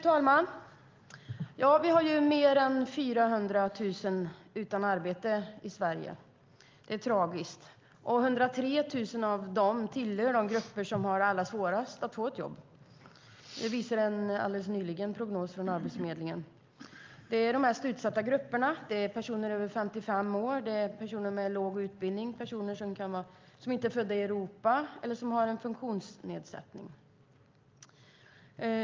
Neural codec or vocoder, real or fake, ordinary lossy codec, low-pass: none; real; Opus, 32 kbps; 7.2 kHz